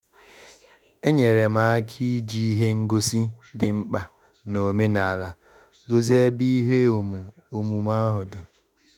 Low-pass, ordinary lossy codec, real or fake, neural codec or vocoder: 19.8 kHz; none; fake; autoencoder, 48 kHz, 32 numbers a frame, DAC-VAE, trained on Japanese speech